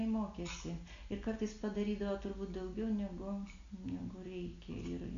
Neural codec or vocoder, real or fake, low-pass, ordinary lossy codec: none; real; 7.2 kHz; AAC, 64 kbps